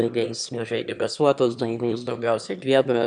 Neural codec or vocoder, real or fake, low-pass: autoencoder, 22.05 kHz, a latent of 192 numbers a frame, VITS, trained on one speaker; fake; 9.9 kHz